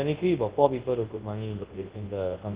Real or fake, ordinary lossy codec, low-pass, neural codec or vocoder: fake; Opus, 16 kbps; 3.6 kHz; codec, 24 kHz, 0.9 kbps, WavTokenizer, large speech release